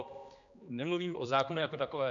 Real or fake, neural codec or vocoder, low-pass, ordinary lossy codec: fake; codec, 16 kHz, 2 kbps, X-Codec, HuBERT features, trained on general audio; 7.2 kHz; AAC, 64 kbps